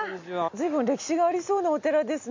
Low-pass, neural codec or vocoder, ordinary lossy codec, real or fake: 7.2 kHz; none; MP3, 64 kbps; real